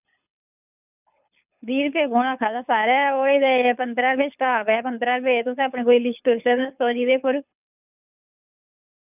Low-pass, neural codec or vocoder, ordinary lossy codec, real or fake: 3.6 kHz; codec, 24 kHz, 6 kbps, HILCodec; none; fake